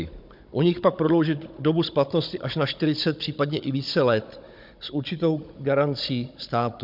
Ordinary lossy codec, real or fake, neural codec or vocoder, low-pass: MP3, 48 kbps; fake; codec, 16 kHz, 16 kbps, FunCodec, trained on Chinese and English, 50 frames a second; 5.4 kHz